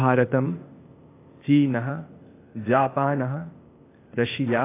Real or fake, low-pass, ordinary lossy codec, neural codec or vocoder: fake; 3.6 kHz; AAC, 24 kbps; codec, 16 kHz, 0.8 kbps, ZipCodec